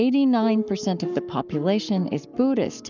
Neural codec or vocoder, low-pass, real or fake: codec, 44.1 kHz, 7.8 kbps, Pupu-Codec; 7.2 kHz; fake